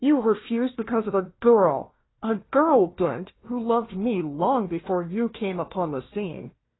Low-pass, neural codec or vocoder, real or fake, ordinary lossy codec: 7.2 kHz; codec, 16 kHz, 1 kbps, FunCodec, trained on Chinese and English, 50 frames a second; fake; AAC, 16 kbps